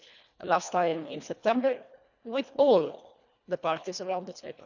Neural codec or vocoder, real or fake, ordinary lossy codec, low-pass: codec, 24 kHz, 1.5 kbps, HILCodec; fake; none; 7.2 kHz